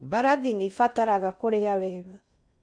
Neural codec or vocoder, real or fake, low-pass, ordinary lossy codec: codec, 16 kHz in and 24 kHz out, 0.6 kbps, FocalCodec, streaming, 4096 codes; fake; 9.9 kHz; none